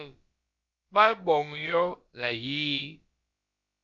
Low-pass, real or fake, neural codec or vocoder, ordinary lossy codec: 7.2 kHz; fake; codec, 16 kHz, about 1 kbps, DyCAST, with the encoder's durations; Opus, 64 kbps